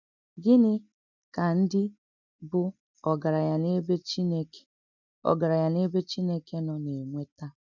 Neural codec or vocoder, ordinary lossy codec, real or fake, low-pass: none; none; real; 7.2 kHz